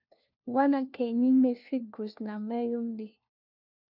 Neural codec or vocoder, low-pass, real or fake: codec, 16 kHz, 1 kbps, FunCodec, trained on LibriTTS, 50 frames a second; 5.4 kHz; fake